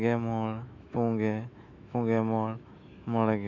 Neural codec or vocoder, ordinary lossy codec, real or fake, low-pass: autoencoder, 48 kHz, 128 numbers a frame, DAC-VAE, trained on Japanese speech; none; fake; 7.2 kHz